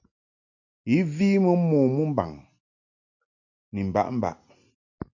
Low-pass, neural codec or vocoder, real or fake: 7.2 kHz; none; real